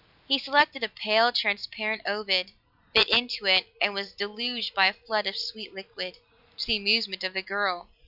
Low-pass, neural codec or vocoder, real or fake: 5.4 kHz; vocoder, 44.1 kHz, 128 mel bands every 256 samples, BigVGAN v2; fake